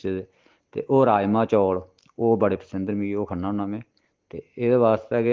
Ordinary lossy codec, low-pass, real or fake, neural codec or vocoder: Opus, 16 kbps; 7.2 kHz; real; none